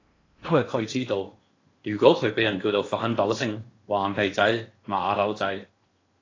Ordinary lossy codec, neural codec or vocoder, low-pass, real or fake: AAC, 32 kbps; codec, 16 kHz in and 24 kHz out, 0.8 kbps, FocalCodec, streaming, 65536 codes; 7.2 kHz; fake